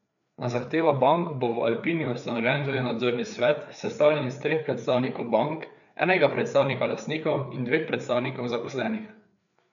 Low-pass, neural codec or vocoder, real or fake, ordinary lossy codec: 7.2 kHz; codec, 16 kHz, 4 kbps, FreqCodec, larger model; fake; none